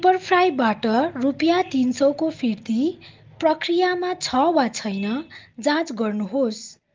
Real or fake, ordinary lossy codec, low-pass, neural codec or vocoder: real; Opus, 32 kbps; 7.2 kHz; none